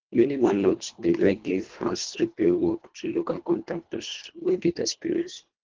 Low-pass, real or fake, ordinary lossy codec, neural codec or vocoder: 7.2 kHz; fake; Opus, 16 kbps; codec, 24 kHz, 1.5 kbps, HILCodec